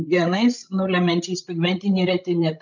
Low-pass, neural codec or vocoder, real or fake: 7.2 kHz; codec, 16 kHz, 16 kbps, FreqCodec, larger model; fake